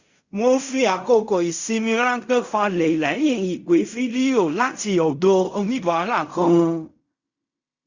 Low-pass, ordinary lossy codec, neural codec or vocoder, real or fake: 7.2 kHz; Opus, 64 kbps; codec, 16 kHz in and 24 kHz out, 0.4 kbps, LongCat-Audio-Codec, fine tuned four codebook decoder; fake